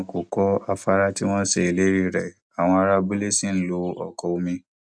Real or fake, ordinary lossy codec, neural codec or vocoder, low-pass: real; none; none; none